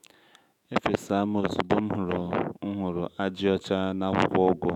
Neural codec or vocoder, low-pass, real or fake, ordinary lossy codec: autoencoder, 48 kHz, 128 numbers a frame, DAC-VAE, trained on Japanese speech; 19.8 kHz; fake; none